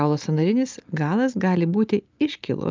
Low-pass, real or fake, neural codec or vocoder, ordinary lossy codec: 7.2 kHz; real; none; Opus, 24 kbps